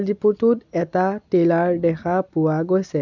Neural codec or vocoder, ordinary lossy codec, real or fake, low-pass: none; none; real; 7.2 kHz